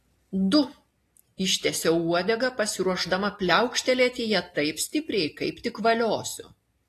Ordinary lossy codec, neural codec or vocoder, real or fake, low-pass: AAC, 48 kbps; none; real; 14.4 kHz